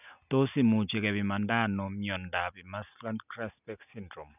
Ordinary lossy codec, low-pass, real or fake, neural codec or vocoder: none; 3.6 kHz; real; none